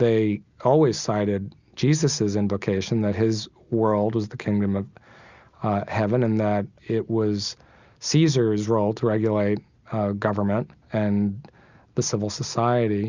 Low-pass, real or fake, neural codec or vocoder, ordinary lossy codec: 7.2 kHz; real; none; Opus, 64 kbps